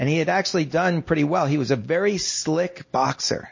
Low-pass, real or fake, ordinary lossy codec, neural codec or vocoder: 7.2 kHz; real; MP3, 32 kbps; none